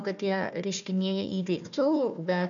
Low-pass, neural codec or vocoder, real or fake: 7.2 kHz; codec, 16 kHz, 1 kbps, FunCodec, trained on Chinese and English, 50 frames a second; fake